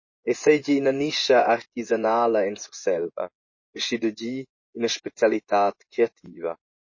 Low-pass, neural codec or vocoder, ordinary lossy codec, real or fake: 7.2 kHz; vocoder, 24 kHz, 100 mel bands, Vocos; MP3, 32 kbps; fake